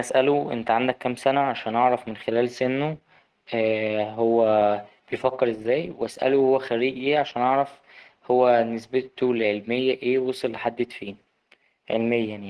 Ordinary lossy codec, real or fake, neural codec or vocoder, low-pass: Opus, 16 kbps; real; none; 10.8 kHz